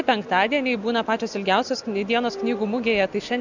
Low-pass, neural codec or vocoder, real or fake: 7.2 kHz; none; real